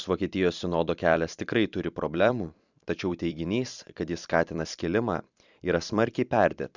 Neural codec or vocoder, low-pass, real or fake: vocoder, 44.1 kHz, 128 mel bands every 256 samples, BigVGAN v2; 7.2 kHz; fake